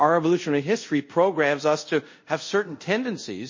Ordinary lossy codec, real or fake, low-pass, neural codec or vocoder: MP3, 32 kbps; fake; 7.2 kHz; codec, 24 kHz, 0.5 kbps, DualCodec